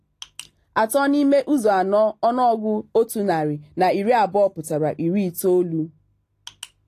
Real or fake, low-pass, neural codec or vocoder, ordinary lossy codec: real; 14.4 kHz; none; AAC, 48 kbps